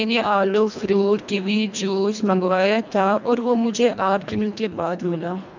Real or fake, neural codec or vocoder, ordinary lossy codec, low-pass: fake; codec, 24 kHz, 1.5 kbps, HILCodec; MP3, 64 kbps; 7.2 kHz